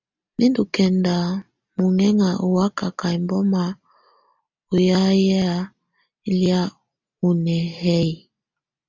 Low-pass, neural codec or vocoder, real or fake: 7.2 kHz; none; real